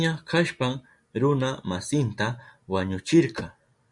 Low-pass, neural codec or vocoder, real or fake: 10.8 kHz; none; real